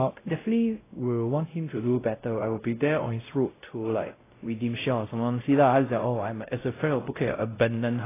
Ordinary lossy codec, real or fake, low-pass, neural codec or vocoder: AAC, 16 kbps; fake; 3.6 kHz; codec, 16 kHz, 0.5 kbps, X-Codec, WavLM features, trained on Multilingual LibriSpeech